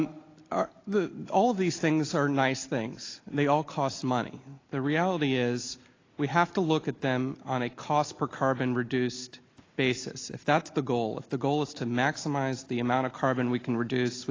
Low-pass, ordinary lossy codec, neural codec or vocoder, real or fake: 7.2 kHz; AAC, 32 kbps; none; real